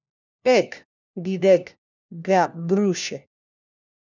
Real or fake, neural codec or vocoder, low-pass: fake; codec, 16 kHz, 1 kbps, FunCodec, trained on LibriTTS, 50 frames a second; 7.2 kHz